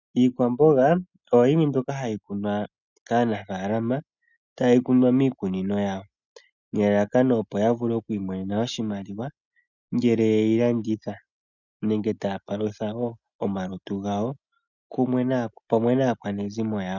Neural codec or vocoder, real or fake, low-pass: none; real; 7.2 kHz